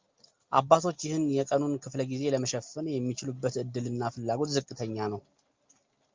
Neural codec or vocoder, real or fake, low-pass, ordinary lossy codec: none; real; 7.2 kHz; Opus, 24 kbps